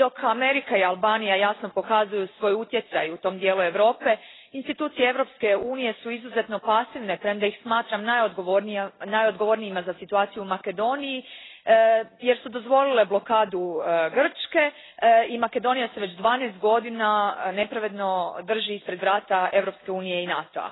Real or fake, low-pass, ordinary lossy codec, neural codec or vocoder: real; 7.2 kHz; AAC, 16 kbps; none